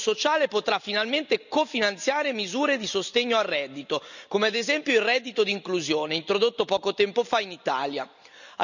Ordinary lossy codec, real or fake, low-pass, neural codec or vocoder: none; real; 7.2 kHz; none